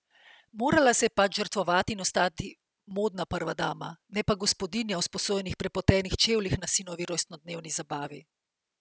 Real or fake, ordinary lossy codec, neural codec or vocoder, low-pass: real; none; none; none